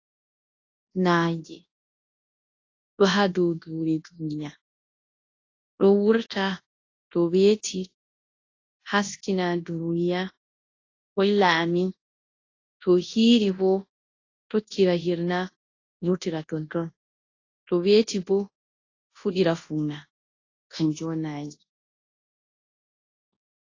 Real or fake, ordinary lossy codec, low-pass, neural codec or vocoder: fake; AAC, 32 kbps; 7.2 kHz; codec, 24 kHz, 0.9 kbps, WavTokenizer, large speech release